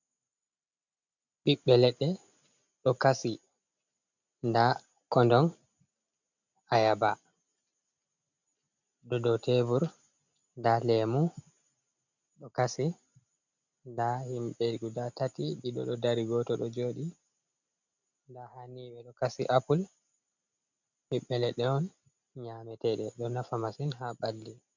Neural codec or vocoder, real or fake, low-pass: none; real; 7.2 kHz